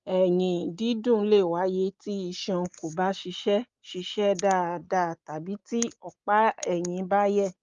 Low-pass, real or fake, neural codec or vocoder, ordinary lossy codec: 7.2 kHz; real; none; Opus, 32 kbps